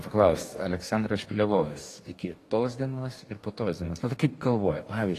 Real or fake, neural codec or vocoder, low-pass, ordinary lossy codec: fake; codec, 44.1 kHz, 2.6 kbps, DAC; 14.4 kHz; AAC, 48 kbps